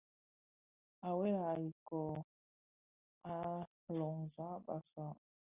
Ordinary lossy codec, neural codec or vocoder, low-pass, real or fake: Opus, 64 kbps; none; 3.6 kHz; real